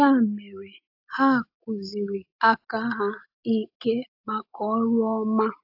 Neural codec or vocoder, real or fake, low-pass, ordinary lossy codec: none; real; 5.4 kHz; AAC, 48 kbps